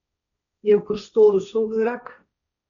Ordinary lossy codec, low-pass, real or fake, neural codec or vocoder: none; 7.2 kHz; fake; codec, 16 kHz, 1.1 kbps, Voila-Tokenizer